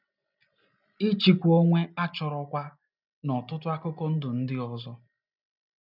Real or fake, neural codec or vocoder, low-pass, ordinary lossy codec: real; none; 5.4 kHz; none